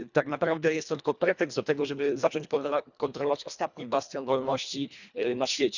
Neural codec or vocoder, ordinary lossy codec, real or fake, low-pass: codec, 24 kHz, 1.5 kbps, HILCodec; none; fake; 7.2 kHz